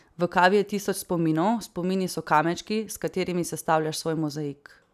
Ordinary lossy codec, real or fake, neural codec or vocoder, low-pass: none; real; none; 14.4 kHz